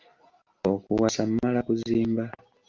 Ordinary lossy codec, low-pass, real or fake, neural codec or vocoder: Opus, 32 kbps; 7.2 kHz; real; none